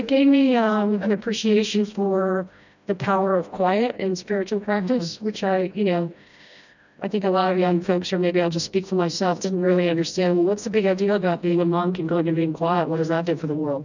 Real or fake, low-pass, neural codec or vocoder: fake; 7.2 kHz; codec, 16 kHz, 1 kbps, FreqCodec, smaller model